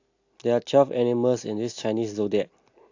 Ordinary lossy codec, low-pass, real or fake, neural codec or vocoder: AAC, 48 kbps; 7.2 kHz; real; none